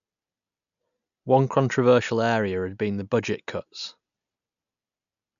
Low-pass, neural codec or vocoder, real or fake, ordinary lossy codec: 7.2 kHz; none; real; none